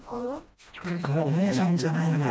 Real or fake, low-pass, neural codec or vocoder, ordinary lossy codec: fake; none; codec, 16 kHz, 1 kbps, FreqCodec, smaller model; none